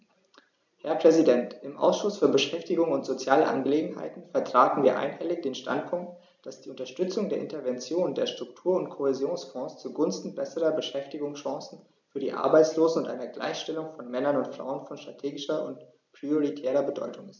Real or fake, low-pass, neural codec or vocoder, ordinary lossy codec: real; 7.2 kHz; none; none